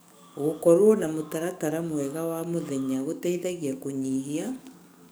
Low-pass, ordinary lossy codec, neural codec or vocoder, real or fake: none; none; codec, 44.1 kHz, 7.8 kbps, DAC; fake